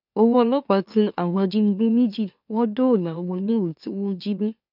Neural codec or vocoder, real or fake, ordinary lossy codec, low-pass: autoencoder, 44.1 kHz, a latent of 192 numbers a frame, MeloTTS; fake; none; 5.4 kHz